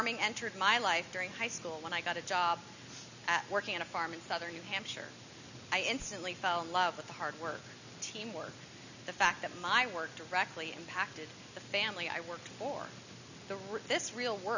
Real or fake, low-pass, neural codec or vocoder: real; 7.2 kHz; none